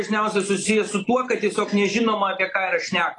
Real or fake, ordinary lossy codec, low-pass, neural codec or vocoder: real; AAC, 32 kbps; 10.8 kHz; none